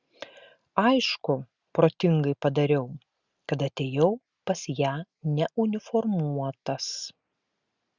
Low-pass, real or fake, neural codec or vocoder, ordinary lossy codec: 7.2 kHz; real; none; Opus, 64 kbps